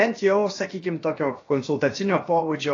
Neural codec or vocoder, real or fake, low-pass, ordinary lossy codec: codec, 16 kHz, about 1 kbps, DyCAST, with the encoder's durations; fake; 7.2 kHz; AAC, 32 kbps